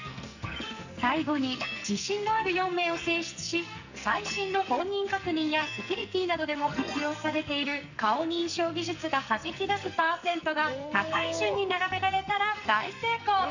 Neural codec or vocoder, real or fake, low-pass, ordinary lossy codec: codec, 44.1 kHz, 2.6 kbps, SNAC; fake; 7.2 kHz; none